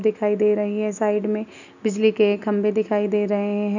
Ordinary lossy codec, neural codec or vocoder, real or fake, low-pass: none; none; real; 7.2 kHz